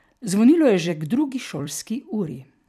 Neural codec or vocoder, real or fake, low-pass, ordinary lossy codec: vocoder, 44.1 kHz, 128 mel bands every 256 samples, BigVGAN v2; fake; 14.4 kHz; none